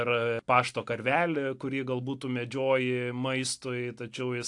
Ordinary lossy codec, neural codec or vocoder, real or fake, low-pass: AAC, 64 kbps; none; real; 10.8 kHz